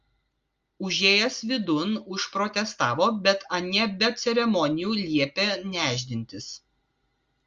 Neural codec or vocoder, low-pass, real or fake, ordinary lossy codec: none; 7.2 kHz; real; Opus, 32 kbps